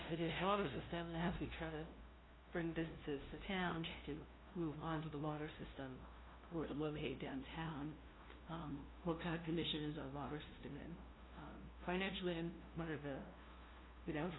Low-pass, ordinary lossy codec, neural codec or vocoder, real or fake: 7.2 kHz; AAC, 16 kbps; codec, 16 kHz, 0.5 kbps, FunCodec, trained on LibriTTS, 25 frames a second; fake